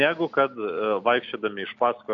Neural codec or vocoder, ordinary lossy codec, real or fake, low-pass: none; MP3, 96 kbps; real; 7.2 kHz